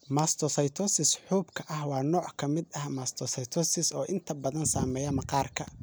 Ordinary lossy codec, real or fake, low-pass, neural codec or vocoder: none; real; none; none